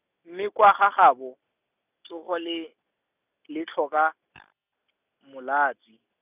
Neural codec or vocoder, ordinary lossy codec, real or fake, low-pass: none; none; real; 3.6 kHz